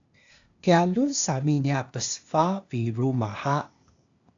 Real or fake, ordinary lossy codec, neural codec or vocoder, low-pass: fake; AAC, 64 kbps; codec, 16 kHz, 0.8 kbps, ZipCodec; 7.2 kHz